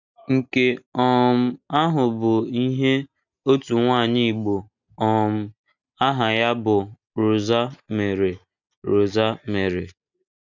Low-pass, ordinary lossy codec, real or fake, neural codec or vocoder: 7.2 kHz; none; real; none